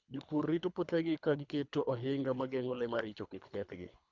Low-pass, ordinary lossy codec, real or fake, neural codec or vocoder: 7.2 kHz; Opus, 64 kbps; fake; codec, 24 kHz, 3 kbps, HILCodec